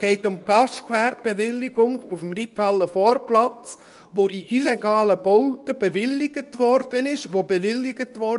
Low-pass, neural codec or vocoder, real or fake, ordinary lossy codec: 10.8 kHz; codec, 24 kHz, 0.9 kbps, WavTokenizer, medium speech release version 2; fake; none